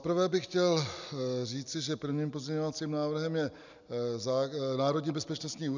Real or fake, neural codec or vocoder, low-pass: real; none; 7.2 kHz